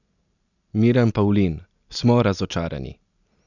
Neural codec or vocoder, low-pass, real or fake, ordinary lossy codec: none; 7.2 kHz; real; none